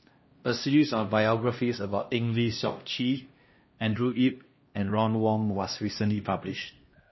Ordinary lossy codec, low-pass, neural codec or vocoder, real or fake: MP3, 24 kbps; 7.2 kHz; codec, 16 kHz, 1 kbps, X-Codec, HuBERT features, trained on LibriSpeech; fake